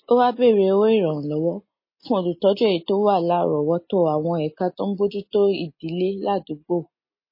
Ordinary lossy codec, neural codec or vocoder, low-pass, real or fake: MP3, 24 kbps; none; 5.4 kHz; real